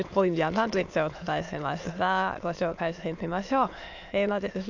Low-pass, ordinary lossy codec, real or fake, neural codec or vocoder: 7.2 kHz; AAC, 48 kbps; fake; autoencoder, 22.05 kHz, a latent of 192 numbers a frame, VITS, trained on many speakers